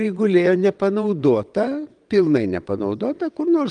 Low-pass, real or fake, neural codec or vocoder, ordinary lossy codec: 9.9 kHz; fake; vocoder, 22.05 kHz, 80 mel bands, WaveNeXt; Opus, 32 kbps